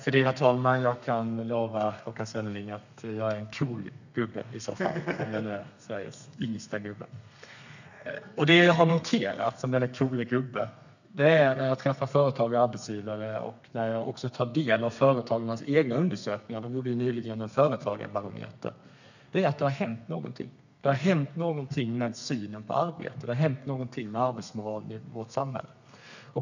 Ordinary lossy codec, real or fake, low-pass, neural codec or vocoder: none; fake; 7.2 kHz; codec, 32 kHz, 1.9 kbps, SNAC